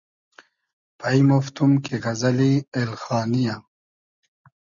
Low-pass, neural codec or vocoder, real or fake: 7.2 kHz; none; real